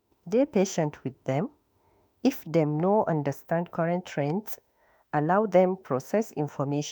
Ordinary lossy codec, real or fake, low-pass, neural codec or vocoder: none; fake; none; autoencoder, 48 kHz, 32 numbers a frame, DAC-VAE, trained on Japanese speech